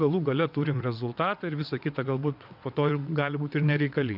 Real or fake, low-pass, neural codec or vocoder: fake; 5.4 kHz; vocoder, 22.05 kHz, 80 mel bands, WaveNeXt